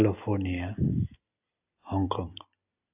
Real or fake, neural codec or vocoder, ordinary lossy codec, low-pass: real; none; AAC, 32 kbps; 3.6 kHz